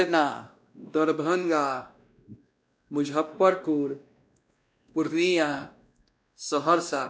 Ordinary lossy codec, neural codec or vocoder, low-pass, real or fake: none; codec, 16 kHz, 1 kbps, X-Codec, WavLM features, trained on Multilingual LibriSpeech; none; fake